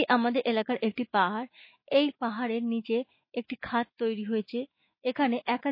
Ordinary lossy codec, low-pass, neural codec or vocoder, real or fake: MP3, 24 kbps; 5.4 kHz; autoencoder, 48 kHz, 128 numbers a frame, DAC-VAE, trained on Japanese speech; fake